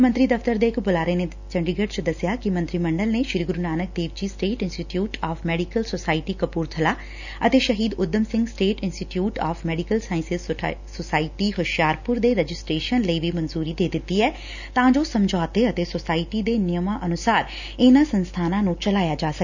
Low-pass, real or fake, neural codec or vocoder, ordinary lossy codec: 7.2 kHz; real; none; none